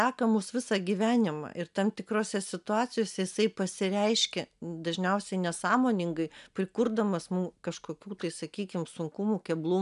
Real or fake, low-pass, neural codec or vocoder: real; 10.8 kHz; none